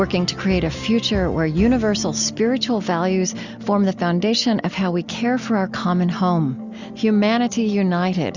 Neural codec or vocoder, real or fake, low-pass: none; real; 7.2 kHz